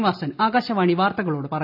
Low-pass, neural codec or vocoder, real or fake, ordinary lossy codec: 5.4 kHz; none; real; AAC, 48 kbps